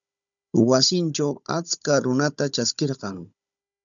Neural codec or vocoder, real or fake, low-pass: codec, 16 kHz, 16 kbps, FunCodec, trained on Chinese and English, 50 frames a second; fake; 7.2 kHz